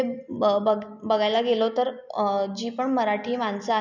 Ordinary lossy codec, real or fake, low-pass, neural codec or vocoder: none; real; 7.2 kHz; none